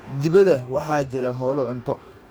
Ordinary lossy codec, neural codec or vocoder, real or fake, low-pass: none; codec, 44.1 kHz, 2.6 kbps, DAC; fake; none